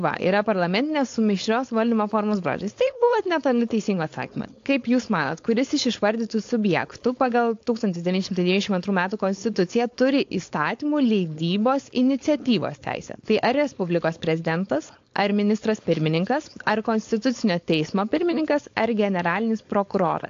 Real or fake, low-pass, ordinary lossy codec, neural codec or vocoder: fake; 7.2 kHz; AAC, 48 kbps; codec, 16 kHz, 4.8 kbps, FACodec